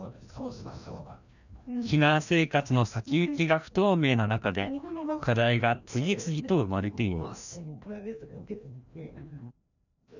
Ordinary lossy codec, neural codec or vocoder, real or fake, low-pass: none; codec, 16 kHz, 1 kbps, FreqCodec, larger model; fake; 7.2 kHz